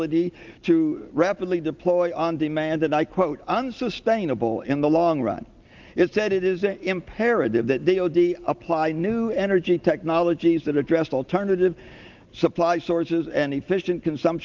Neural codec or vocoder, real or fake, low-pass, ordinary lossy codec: none; real; 7.2 kHz; Opus, 32 kbps